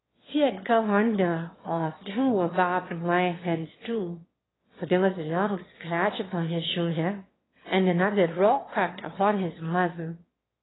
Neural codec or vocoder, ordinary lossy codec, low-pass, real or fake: autoencoder, 22.05 kHz, a latent of 192 numbers a frame, VITS, trained on one speaker; AAC, 16 kbps; 7.2 kHz; fake